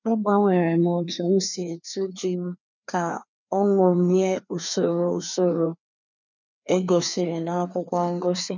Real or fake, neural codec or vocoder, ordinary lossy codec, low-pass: fake; codec, 16 kHz, 2 kbps, FreqCodec, larger model; none; 7.2 kHz